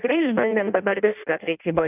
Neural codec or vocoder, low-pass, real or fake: codec, 16 kHz in and 24 kHz out, 0.6 kbps, FireRedTTS-2 codec; 3.6 kHz; fake